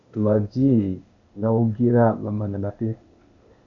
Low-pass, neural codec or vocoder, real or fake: 7.2 kHz; codec, 16 kHz, 0.8 kbps, ZipCodec; fake